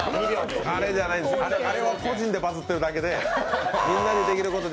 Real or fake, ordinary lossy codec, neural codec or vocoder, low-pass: real; none; none; none